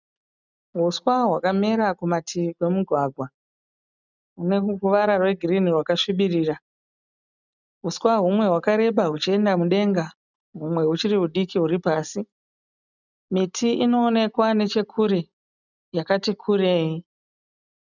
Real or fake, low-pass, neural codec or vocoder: real; 7.2 kHz; none